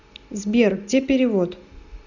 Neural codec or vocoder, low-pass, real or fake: none; 7.2 kHz; real